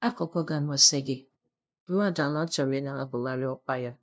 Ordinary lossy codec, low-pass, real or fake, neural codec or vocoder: none; none; fake; codec, 16 kHz, 0.5 kbps, FunCodec, trained on LibriTTS, 25 frames a second